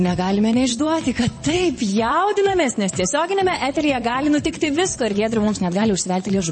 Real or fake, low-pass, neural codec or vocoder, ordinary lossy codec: fake; 9.9 kHz; vocoder, 22.05 kHz, 80 mel bands, WaveNeXt; MP3, 32 kbps